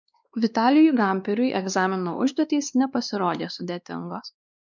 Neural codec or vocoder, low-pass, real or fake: codec, 16 kHz, 2 kbps, X-Codec, WavLM features, trained on Multilingual LibriSpeech; 7.2 kHz; fake